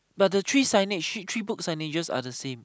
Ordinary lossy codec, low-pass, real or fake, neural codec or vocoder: none; none; real; none